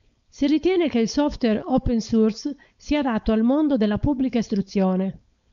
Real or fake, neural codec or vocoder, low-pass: fake; codec, 16 kHz, 4.8 kbps, FACodec; 7.2 kHz